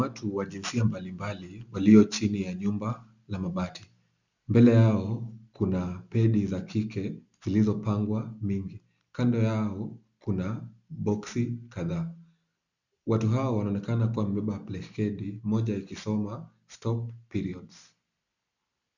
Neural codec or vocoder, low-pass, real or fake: none; 7.2 kHz; real